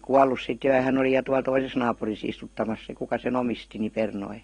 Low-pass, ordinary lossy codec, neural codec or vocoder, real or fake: 9.9 kHz; AAC, 32 kbps; none; real